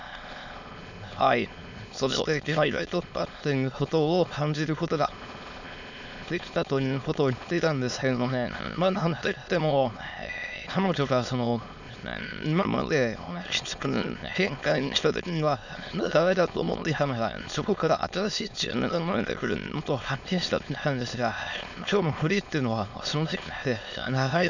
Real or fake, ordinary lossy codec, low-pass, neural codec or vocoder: fake; none; 7.2 kHz; autoencoder, 22.05 kHz, a latent of 192 numbers a frame, VITS, trained on many speakers